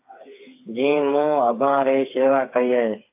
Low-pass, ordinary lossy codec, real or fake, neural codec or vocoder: 3.6 kHz; AAC, 32 kbps; fake; codec, 16 kHz, 4 kbps, FreqCodec, smaller model